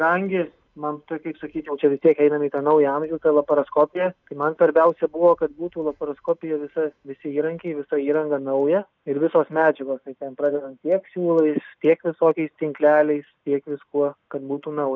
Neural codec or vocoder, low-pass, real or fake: none; 7.2 kHz; real